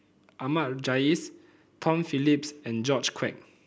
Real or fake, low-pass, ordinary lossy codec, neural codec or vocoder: real; none; none; none